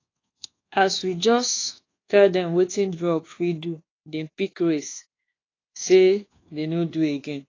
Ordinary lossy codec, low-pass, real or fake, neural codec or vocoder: AAC, 32 kbps; 7.2 kHz; fake; codec, 24 kHz, 1.2 kbps, DualCodec